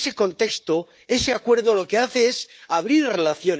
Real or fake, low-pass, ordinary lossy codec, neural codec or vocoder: fake; none; none; codec, 16 kHz, 4 kbps, FunCodec, trained on Chinese and English, 50 frames a second